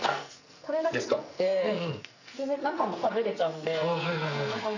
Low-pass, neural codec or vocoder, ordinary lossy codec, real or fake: 7.2 kHz; codec, 44.1 kHz, 2.6 kbps, SNAC; none; fake